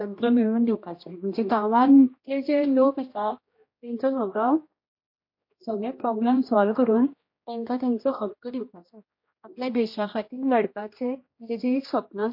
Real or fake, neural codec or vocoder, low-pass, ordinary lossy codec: fake; codec, 16 kHz, 1 kbps, X-Codec, HuBERT features, trained on general audio; 5.4 kHz; MP3, 32 kbps